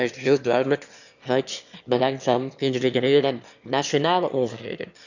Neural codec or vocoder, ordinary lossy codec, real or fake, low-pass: autoencoder, 22.05 kHz, a latent of 192 numbers a frame, VITS, trained on one speaker; none; fake; 7.2 kHz